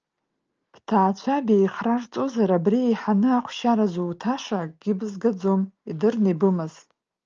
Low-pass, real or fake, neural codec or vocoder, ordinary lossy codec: 7.2 kHz; real; none; Opus, 24 kbps